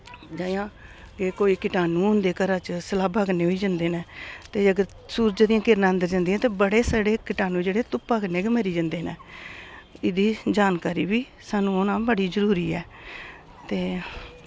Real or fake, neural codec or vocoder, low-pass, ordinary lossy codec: real; none; none; none